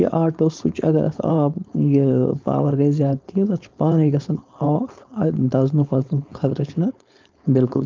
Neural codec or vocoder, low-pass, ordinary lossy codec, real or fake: codec, 16 kHz, 4.8 kbps, FACodec; 7.2 kHz; Opus, 24 kbps; fake